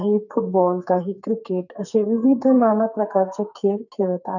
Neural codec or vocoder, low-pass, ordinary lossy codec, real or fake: codec, 44.1 kHz, 2.6 kbps, SNAC; 7.2 kHz; none; fake